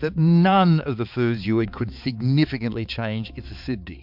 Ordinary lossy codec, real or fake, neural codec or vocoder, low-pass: MP3, 48 kbps; fake; codec, 16 kHz, 4 kbps, X-Codec, HuBERT features, trained on balanced general audio; 5.4 kHz